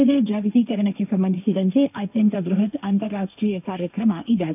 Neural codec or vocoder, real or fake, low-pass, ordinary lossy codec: codec, 16 kHz, 1.1 kbps, Voila-Tokenizer; fake; 3.6 kHz; none